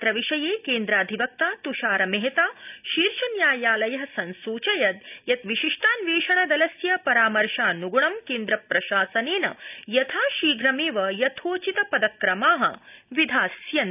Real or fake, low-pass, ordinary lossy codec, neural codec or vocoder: real; 3.6 kHz; none; none